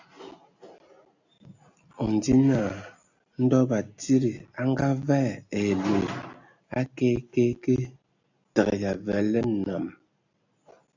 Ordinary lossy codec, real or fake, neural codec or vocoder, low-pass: AAC, 48 kbps; real; none; 7.2 kHz